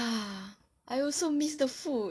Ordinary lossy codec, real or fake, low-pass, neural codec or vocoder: none; real; none; none